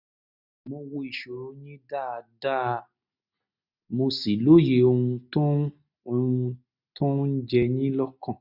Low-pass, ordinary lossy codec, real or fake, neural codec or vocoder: 5.4 kHz; none; real; none